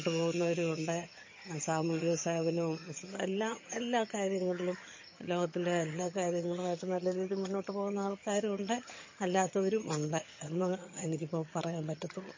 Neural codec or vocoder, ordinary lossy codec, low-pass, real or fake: vocoder, 22.05 kHz, 80 mel bands, HiFi-GAN; MP3, 32 kbps; 7.2 kHz; fake